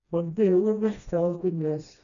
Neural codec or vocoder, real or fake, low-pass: codec, 16 kHz, 1 kbps, FreqCodec, smaller model; fake; 7.2 kHz